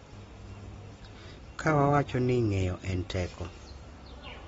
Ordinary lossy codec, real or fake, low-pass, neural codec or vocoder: AAC, 24 kbps; real; 19.8 kHz; none